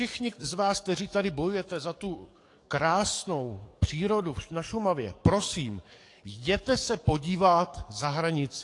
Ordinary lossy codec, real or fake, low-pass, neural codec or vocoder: AAC, 48 kbps; fake; 10.8 kHz; codec, 44.1 kHz, 7.8 kbps, DAC